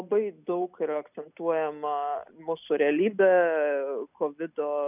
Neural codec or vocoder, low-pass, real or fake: none; 3.6 kHz; real